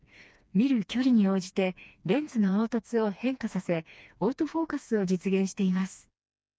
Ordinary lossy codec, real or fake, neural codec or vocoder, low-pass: none; fake; codec, 16 kHz, 2 kbps, FreqCodec, smaller model; none